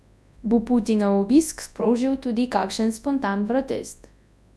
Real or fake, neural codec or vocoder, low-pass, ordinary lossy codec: fake; codec, 24 kHz, 0.9 kbps, WavTokenizer, large speech release; none; none